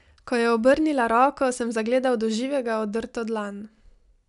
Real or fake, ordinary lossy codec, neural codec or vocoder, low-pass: real; none; none; 10.8 kHz